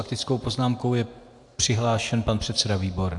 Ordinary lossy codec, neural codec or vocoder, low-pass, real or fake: AAC, 64 kbps; vocoder, 44.1 kHz, 128 mel bands every 512 samples, BigVGAN v2; 10.8 kHz; fake